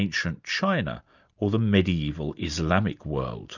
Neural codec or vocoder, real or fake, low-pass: none; real; 7.2 kHz